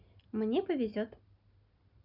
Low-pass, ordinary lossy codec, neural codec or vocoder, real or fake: 5.4 kHz; none; none; real